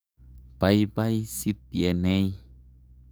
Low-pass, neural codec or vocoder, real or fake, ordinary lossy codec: none; codec, 44.1 kHz, 7.8 kbps, DAC; fake; none